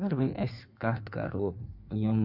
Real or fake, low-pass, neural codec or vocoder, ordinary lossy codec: fake; 5.4 kHz; codec, 16 kHz in and 24 kHz out, 1.1 kbps, FireRedTTS-2 codec; none